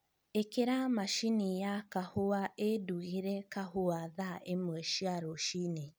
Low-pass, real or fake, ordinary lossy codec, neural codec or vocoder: none; real; none; none